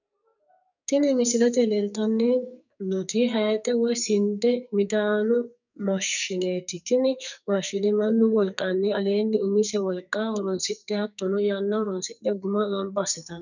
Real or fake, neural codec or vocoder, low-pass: fake; codec, 44.1 kHz, 2.6 kbps, SNAC; 7.2 kHz